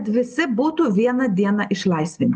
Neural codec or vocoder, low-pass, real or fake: none; 10.8 kHz; real